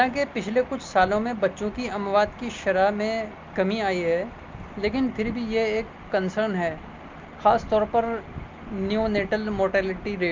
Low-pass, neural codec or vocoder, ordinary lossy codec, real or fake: 7.2 kHz; none; Opus, 32 kbps; real